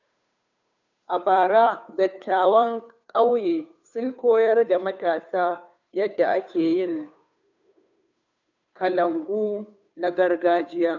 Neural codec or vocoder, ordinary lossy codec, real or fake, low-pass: codec, 16 kHz, 2 kbps, FunCodec, trained on Chinese and English, 25 frames a second; none; fake; 7.2 kHz